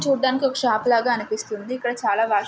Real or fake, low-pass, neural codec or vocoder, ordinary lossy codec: real; none; none; none